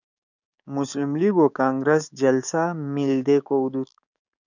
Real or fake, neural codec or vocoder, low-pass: fake; codec, 16 kHz, 6 kbps, DAC; 7.2 kHz